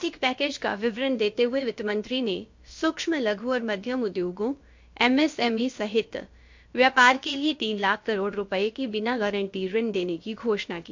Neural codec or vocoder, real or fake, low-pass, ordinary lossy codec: codec, 16 kHz, about 1 kbps, DyCAST, with the encoder's durations; fake; 7.2 kHz; MP3, 48 kbps